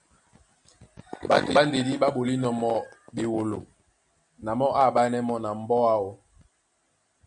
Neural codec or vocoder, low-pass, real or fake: none; 9.9 kHz; real